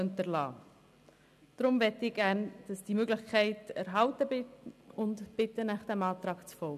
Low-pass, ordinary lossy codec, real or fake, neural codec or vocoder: 14.4 kHz; none; real; none